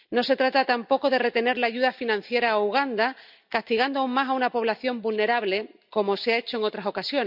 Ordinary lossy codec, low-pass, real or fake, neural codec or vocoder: none; 5.4 kHz; real; none